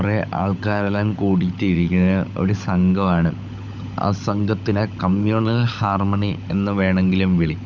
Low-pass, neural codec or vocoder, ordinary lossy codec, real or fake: 7.2 kHz; codec, 16 kHz, 16 kbps, FunCodec, trained on LibriTTS, 50 frames a second; none; fake